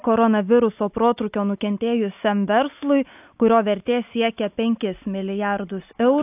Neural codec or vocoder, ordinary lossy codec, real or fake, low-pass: none; AAC, 32 kbps; real; 3.6 kHz